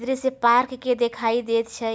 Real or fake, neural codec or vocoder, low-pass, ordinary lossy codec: real; none; none; none